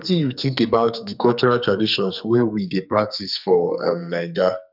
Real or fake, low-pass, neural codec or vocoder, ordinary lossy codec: fake; 5.4 kHz; codec, 44.1 kHz, 2.6 kbps, SNAC; none